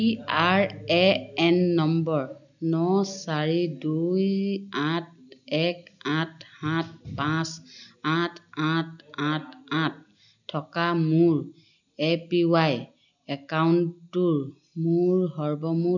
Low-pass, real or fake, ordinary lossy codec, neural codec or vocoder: 7.2 kHz; real; none; none